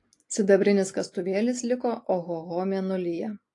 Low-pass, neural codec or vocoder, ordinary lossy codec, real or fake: 10.8 kHz; none; AAC, 48 kbps; real